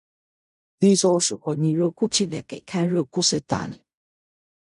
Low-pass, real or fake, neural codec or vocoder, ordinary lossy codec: 10.8 kHz; fake; codec, 16 kHz in and 24 kHz out, 0.4 kbps, LongCat-Audio-Codec, fine tuned four codebook decoder; none